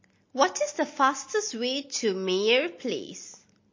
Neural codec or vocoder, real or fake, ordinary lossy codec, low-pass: none; real; MP3, 32 kbps; 7.2 kHz